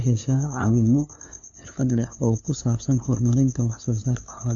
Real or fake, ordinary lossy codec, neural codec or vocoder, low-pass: fake; none; codec, 16 kHz, 2 kbps, FunCodec, trained on LibriTTS, 25 frames a second; 7.2 kHz